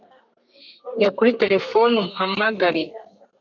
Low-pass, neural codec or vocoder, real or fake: 7.2 kHz; codec, 32 kHz, 1.9 kbps, SNAC; fake